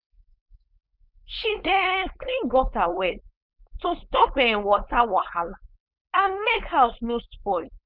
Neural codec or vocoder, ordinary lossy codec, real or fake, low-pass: codec, 16 kHz, 4.8 kbps, FACodec; none; fake; 5.4 kHz